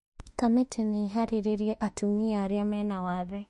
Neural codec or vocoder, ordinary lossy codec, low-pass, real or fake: autoencoder, 48 kHz, 32 numbers a frame, DAC-VAE, trained on Japanese speech; MP3, 48 kbps; 14.4 kHz; fake